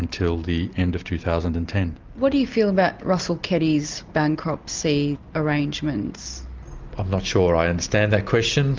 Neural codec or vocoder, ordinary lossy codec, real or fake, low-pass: none; Opus, 24 kbps; real; 7.2 kHz